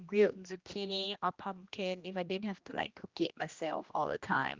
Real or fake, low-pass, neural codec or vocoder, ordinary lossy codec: fake; 7.2 kHz; codec, 16 kHz, 1 kbps, X-Codec, HuBERT features, trained on general audio; Opus, 24 kbps